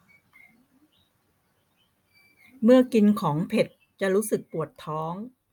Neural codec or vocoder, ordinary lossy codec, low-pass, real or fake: none; none; 19.8 kHz; real